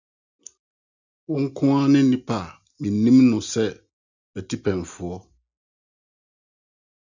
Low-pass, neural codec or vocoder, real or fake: 7.2 kHz; none; real